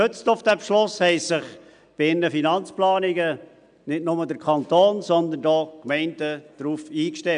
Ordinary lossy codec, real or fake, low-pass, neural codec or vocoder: none; real; 9.9 kHz; none